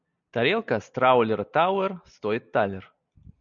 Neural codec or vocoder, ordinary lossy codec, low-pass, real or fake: none; MP3, 96 kbps; 7.2 kHz; real